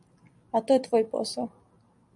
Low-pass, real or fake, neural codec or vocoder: 10.8 kHz; real; none